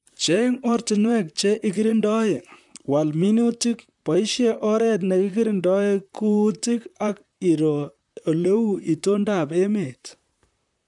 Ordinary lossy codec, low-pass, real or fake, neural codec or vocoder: none; 10.8 kHz; fake; vocoder, 44.1 kHz, 128 mel bands, Pupu-Vocoder